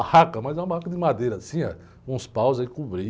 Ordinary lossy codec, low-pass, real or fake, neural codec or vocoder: none; none; real; none